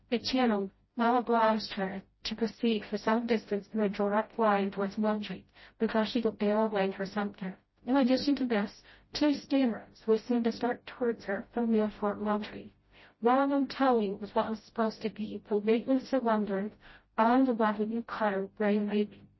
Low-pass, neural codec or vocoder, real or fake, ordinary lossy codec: 7.2 kHz; codec, 16 kHz, 0.5 kbps, FreqCodec, smaller model; fake; MP3, 24 kbps